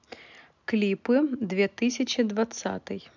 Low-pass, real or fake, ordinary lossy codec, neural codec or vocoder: 7.2 kHz; real; none; none